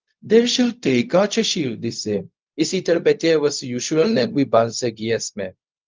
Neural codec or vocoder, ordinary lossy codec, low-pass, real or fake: codec, 16 kHz, 0.4 kbps, LongCat-Audio-Codec; Opus, 32 kbps; 7.2 kHz; fake